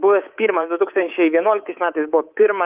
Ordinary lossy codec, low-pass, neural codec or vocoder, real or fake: Opus, 32 kbps; 3.6 kHz; codec, 24 kHz, 3.1 kbps, DualCodec; fake